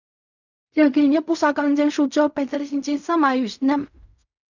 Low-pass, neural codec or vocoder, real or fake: 7.2 kHz; codec, 16 kHz in and 24 kHz out, 0.4 kbps, LongCat-Audio-Codec, fine tuned four codebook decoder; fake